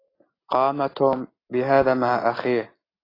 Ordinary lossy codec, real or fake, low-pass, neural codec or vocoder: AAC, 24 kbps; real; 5.4 kHz; none